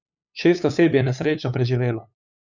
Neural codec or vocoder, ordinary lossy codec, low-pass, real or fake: codec, 16 kHz, 8 kbps, FunCodec, trained on LibriTTS, 25 frames a second; none; 7.2 kHz; fake